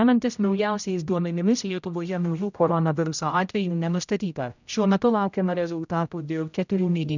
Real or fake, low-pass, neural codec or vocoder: fake; 7.2 kHz; codec, 16 kHz, 0.5 kbps, X-Codec, HuBERT features, trained on general audio